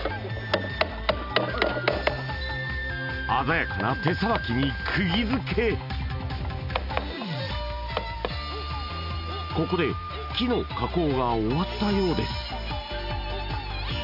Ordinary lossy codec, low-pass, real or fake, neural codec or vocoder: none; 5.4 kHz; real; none